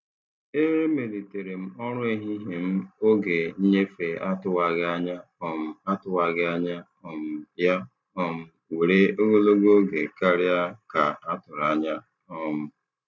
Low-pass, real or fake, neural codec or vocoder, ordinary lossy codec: none; real; none; none